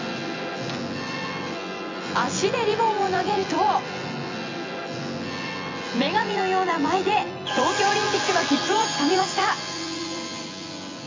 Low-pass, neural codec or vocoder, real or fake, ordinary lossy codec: 7.2 kHz; vocoder, 24 kHz, 100 mel bands, Vocos; fake; MP3, 64 kbps